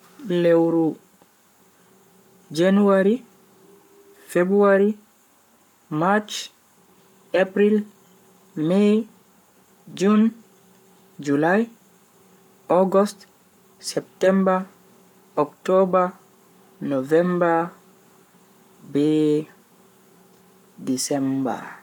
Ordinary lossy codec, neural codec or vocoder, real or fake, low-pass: none; codec, 44.1 kHz, 7.8 kbps, Pupu-Codec; fake; 19.8 kHz